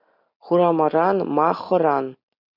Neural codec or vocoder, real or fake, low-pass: none; real; 5.4 kHz